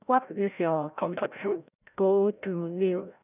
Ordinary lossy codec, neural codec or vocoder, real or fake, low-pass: none; codec, 16 kHz, 0.5 kbps, FreqCodec, larger model; fake; 3.6 kHz